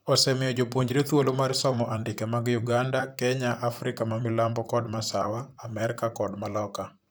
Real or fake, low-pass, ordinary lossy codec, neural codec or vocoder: fake; none; none; vocoder, 44.1 kHz, 128 mel bands, Pupu-Vocoder